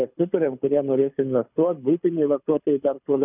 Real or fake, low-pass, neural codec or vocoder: fake; 3.6 kHz; codec, 24 kHz, 6 kbps, HILCodec